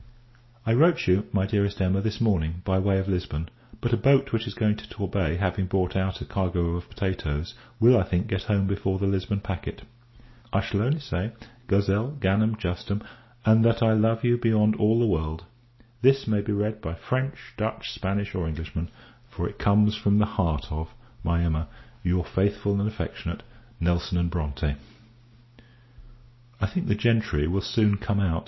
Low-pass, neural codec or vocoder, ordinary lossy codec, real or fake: 7.2 kHz; none; MP3, 24 kbps; real